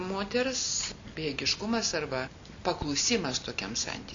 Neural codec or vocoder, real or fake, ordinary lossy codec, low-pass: none; real; MP3, 64 kbps; 7.2 kHz